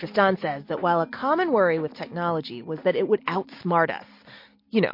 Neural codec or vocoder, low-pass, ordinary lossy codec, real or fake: none; 5.4 kHz; MP3, 32 kbps; real